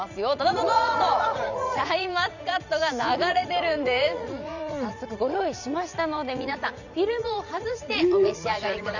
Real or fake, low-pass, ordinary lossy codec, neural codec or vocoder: fake; 7.2 kHz; none; vocoder, 44.1 kHz, 80 mel bands, Vocos